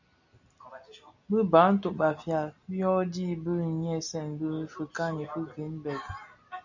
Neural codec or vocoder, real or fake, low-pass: none; real; 7.2 kHz